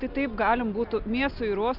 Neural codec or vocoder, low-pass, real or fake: none; 5.4 kHz; real